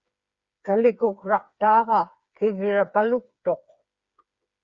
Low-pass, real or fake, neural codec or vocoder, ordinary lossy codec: 7.2 kHz; fake; codec, 16 kHz, 4 kbps, FreqCodec, smaller model; Opus, 64 kbps